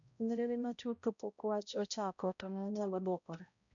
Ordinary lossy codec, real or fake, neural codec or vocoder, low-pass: none; fake; codec, 16 kHz, 0.5 kbps, X-Codec, HuBERT features, trained on balanced general audio; 7.2 kHz